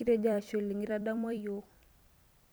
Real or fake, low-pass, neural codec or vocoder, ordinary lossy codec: fake; none; vocoder, 44.1 kHz, 128 mel bands every 256 samples, BigVGAN v2; none